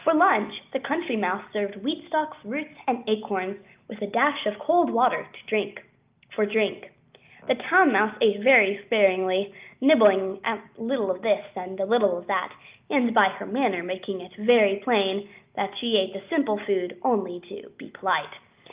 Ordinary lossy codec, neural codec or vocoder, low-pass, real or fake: Opus, 24 kbps; none; 3.6 kHz; real